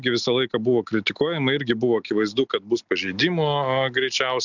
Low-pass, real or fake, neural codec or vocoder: 7.2 kHz; real; none